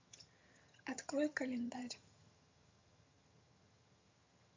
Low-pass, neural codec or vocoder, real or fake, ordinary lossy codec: 7.2 kHz; vocoder, 22.05 kHz, 80 mel bands, HiFi-GAN; fake; MP3, 48 kbps